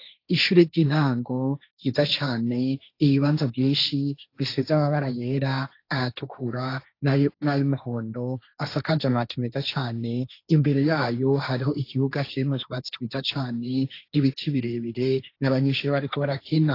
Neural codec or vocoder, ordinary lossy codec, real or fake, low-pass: codec, 16 kHz, 1.1 kbps, Voila-Tokenizer; AAC, 32 kbps; fake; 5.4 kHz